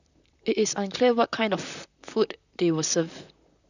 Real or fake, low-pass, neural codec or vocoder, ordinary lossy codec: fake; 7.2 kHz; vocoder, 44.1 kHz, 128 mel bands, Pupu-Vocoder; none